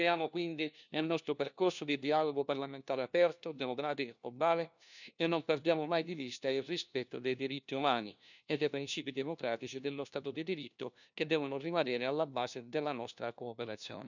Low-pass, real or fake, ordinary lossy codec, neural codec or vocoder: 7.2 kHz; fake; none; codec, 16 kHz, 1 kbps, FunCodec, trained on LibriTTS, 50 frames a second